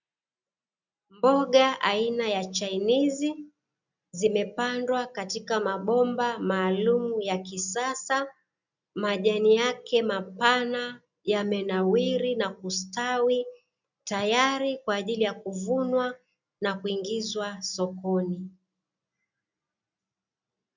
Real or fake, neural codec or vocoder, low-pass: real; none; 7.2 kHz